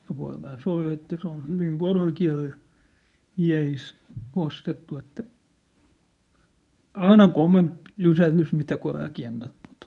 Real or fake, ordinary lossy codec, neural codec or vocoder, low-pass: fake; none; codec, 24 kHz, 0.9 kbps, WavTokenizer, medium speech release version 1; 10.8 kHz